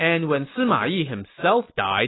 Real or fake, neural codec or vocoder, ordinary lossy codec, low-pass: real; none; AAC, 16 kbps; 7.2 kHz